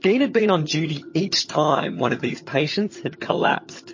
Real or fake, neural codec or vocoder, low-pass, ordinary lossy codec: fake; vocoder, 22.05 kHz, 80 mel bands, HiFi-GAN; 7.2 kHz; MP3, 32 kbps